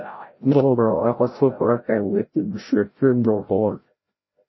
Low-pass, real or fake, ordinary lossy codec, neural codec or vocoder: 7.2 kHz; fake; MP3, 24 kbps; codec, 16 kHz, 0.5 kbps, FreqCodec, larger model